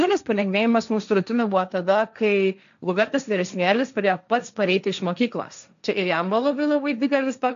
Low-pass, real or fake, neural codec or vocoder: 7.2 kHz; fake; codec, 16 kHz, 1.1 kbps, Voila-Tokenizer